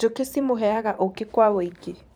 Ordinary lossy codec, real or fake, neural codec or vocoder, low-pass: none; fake; vocoder, 44.1 kHz, 128 mel bands every 512 samples, BigVGAN v2; none